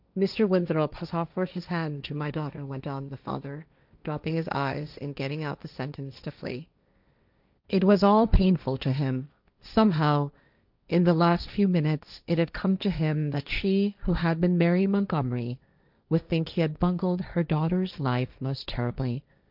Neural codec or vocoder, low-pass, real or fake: codec, 16 kHz, 1.1 kbps, Voila-Tokenizer; 5.4 kHz; fake